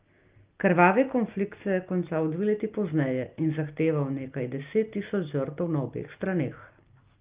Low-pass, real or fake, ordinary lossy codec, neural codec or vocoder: 3.6 kHz; real; Opus, 32 kbps; none